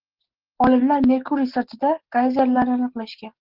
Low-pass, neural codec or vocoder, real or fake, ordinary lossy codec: 5.4 kHz; none; real; Opus, 16 kbps